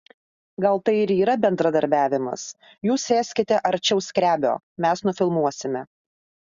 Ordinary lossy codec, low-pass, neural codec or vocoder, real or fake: Opus, 64 kbps; 7.2 kHz; none; real